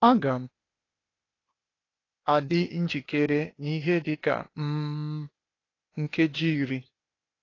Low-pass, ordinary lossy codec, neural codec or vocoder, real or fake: 7.2 kHz; AAC, 32 kbps; codec, 16 kHz, 0.8 kbps, ZipCodec; fake